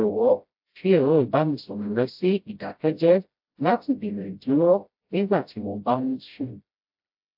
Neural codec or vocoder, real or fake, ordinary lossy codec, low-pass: codec, 16 kHz, 0.5 kbps, FreqCodec, smaller model; fake; AAC, 48 kbps; 5.4 kHz